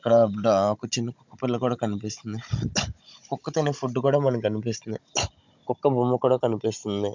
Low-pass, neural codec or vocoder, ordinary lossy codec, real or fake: 7.2 kHz; codec, 16 kHz, 16 kbps, FunCodec, trained on Chinese and English, 50 frames a second; MP3, 64 kbps; fake